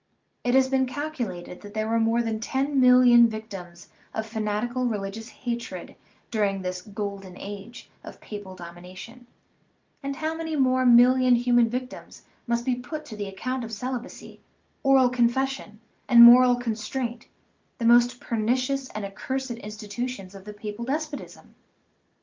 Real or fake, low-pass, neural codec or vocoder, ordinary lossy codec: real; 7.2 kHz; none; Opus, 32 kbps